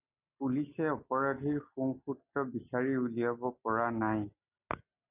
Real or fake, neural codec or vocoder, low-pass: real; none; 3.6 kHz